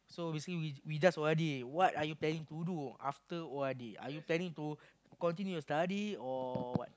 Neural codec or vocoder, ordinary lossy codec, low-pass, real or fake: none; none; none; real